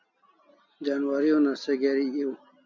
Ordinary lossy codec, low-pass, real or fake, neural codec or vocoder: MP3, 64 kbps; 7.2 kHz; real; none